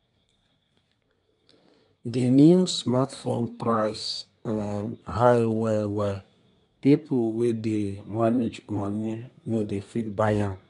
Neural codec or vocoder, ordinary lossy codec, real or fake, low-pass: codec, 24 kHz, 1 kbps, SNAC; none; fake; 10.8 kHz